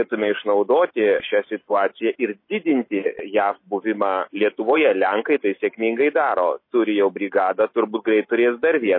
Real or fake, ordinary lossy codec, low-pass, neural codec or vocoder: real; MP3, 24 kbps; 5.4 kHz; none